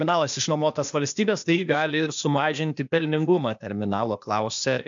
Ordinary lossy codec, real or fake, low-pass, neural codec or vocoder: MP3, 64 kbps; fake; 7.2 kHz; codec, 16 kHz, 0.8 kbps, ZipCodec